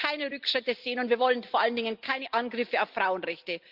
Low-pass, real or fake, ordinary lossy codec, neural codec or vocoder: 5.4 kHz; real; Opus, 24 kbps; none